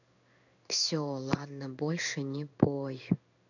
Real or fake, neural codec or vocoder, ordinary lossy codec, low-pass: fake; codec, 16 kHz in and 24 kHz out, 1 kbps, XY-Tokenizer; none; 7.2 kHz